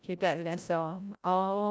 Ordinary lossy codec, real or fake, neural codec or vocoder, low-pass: none; fake; codec, 16 kHz, 0.5 kbps, FreqCodec, larger model; none